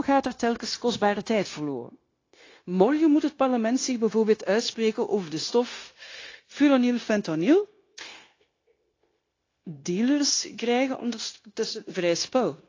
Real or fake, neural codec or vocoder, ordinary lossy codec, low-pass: fake; codec, 16 kHz, 0.9 kbps, LongCat-Audio-Codec; AAC, 32 kbps; 7.2 kHz